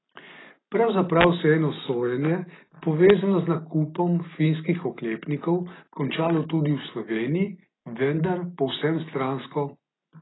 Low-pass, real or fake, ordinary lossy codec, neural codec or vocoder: 7.2 kHz; real; AAC, 16 kbps; none